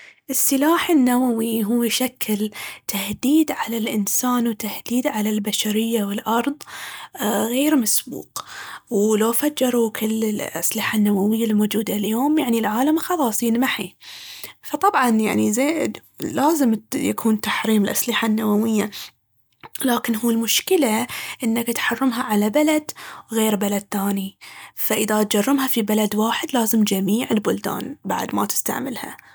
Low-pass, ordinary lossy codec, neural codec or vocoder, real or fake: none; none; none; real